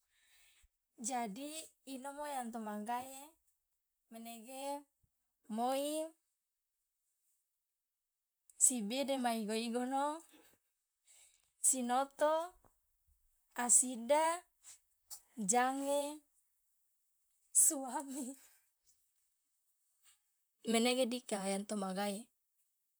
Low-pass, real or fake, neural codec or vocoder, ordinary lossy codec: none; fake; vocoder, 44.1 kHz, 128 mel bands, Pupu-Vocoder; none